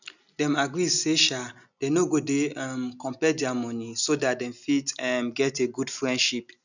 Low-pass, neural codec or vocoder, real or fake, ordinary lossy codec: 7.2 kHz; none; real; none